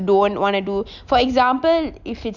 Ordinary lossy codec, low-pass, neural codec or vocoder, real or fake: none; 7.2 kHz; none; real